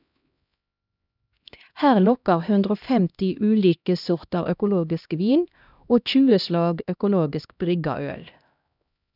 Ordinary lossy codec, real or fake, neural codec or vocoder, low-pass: none; fake; codec, 16 kHz, 1 kbps, X-Codec, HuBERT features, trained on LibriSpeech; 5.4 kHz